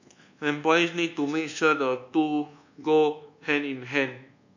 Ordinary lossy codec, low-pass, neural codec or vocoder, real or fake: none; 7.2 kHz; codec, 24 kHz, 1.2 kbps, DualCodec; fake